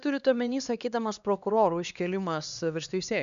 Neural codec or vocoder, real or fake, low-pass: codec, 16 kHz, 2 kbps, X-Codec, HuBERT features, trained on LibriSpeech; fake; 7.2 kHz